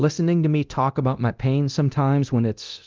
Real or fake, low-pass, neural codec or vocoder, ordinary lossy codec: fake; 7.2 kHz; codec, 24 kHz, 0.9 kbps, DualCodec; Opus, 32 kbps